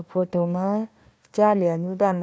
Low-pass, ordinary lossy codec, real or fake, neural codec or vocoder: none; none; fake; codec, 16 kHz, 1 kbps, FunCodec, trained on Chinese and English, 50 frames a second